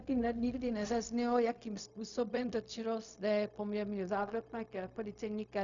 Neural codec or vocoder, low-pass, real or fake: codec, 16 kHz, 0.4 kbps, LongCat-Audio-Codec; 7.2 kHz; fake